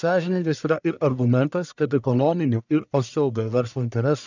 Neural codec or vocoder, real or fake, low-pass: codec, 44.1 kHz, 1.7 kbps, Pupu-Codec; fake; 7.2 kHz